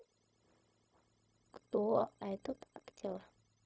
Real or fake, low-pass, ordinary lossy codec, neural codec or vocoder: fake; none; none; codec, 16 kHz, 0.4 kbps, LongCat-Audio-Codec